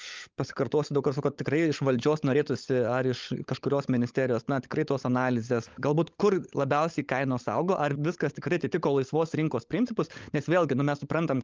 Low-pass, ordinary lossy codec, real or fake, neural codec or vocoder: 7.2 kHz; Opus, 32 kbps; fake; codec, 16 kHz, 16 kbps, FunCodec, trained on LibriTTS, 50 frames a second